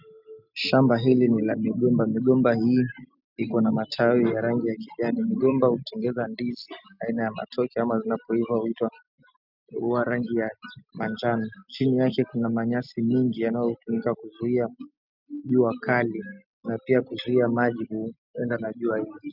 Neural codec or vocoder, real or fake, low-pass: none; real; 5.4 kHz